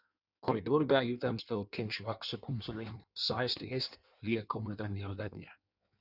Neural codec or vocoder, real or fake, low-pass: codec, 16 kHz in and 24 kHz out, 1.1 kbps, FireRedTTS-2 codec; fake; 5.4 kHz